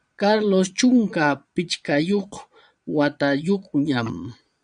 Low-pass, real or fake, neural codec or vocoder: 9.9 kHz; fake; vocoder, 22.05 kHz, 80 mel bands, Vocos